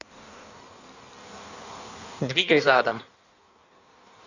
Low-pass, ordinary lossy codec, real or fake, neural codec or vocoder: 7.2 kHz; none; fake; codec, 16 kHz in and 24 kHz out, 1.1 kbps, FireRedTTS-2 codec